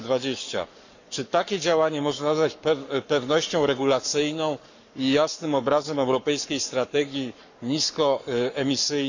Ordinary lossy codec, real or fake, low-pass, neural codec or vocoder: none; fake; 7.2 kHz; codec, 44.1 kHz, 7.8 kbps, Pupu-Codec